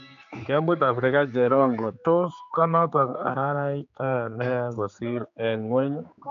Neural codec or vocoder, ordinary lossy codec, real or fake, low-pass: codec, 16 kHz, 4 kbps, X-Codec, HuBERT features, trained on general audio; AAC, 64 kbps; fake; 7.2 kHz